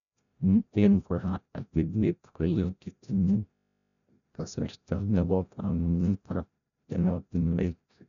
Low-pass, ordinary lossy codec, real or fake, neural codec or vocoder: 7.2 kHz; none; fake; codec, 16 kHz, 0.5 kbps, FreqCodec, larger model